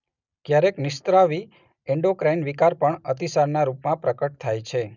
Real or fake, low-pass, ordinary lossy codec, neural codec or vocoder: real; 7.2 kHz; none; none